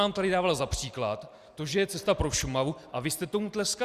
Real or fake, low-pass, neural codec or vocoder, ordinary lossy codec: real; 14.4 kHz; none; Opus, 64 kbps